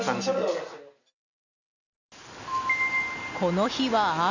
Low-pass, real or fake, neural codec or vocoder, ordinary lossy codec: 7.2 kHz; real; none; none